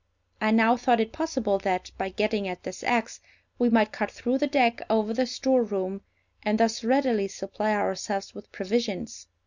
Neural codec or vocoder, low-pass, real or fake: none; 7.2 kHz; real